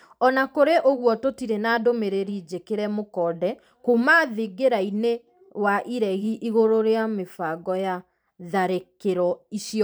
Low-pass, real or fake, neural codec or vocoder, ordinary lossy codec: none; fake; vocoder, 44.1 kHz, 128 mel bands every 512 samples, BigVGAN v2; none